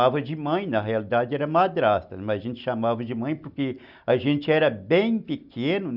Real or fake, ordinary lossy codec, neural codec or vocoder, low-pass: real; none; none; 5.4 kHz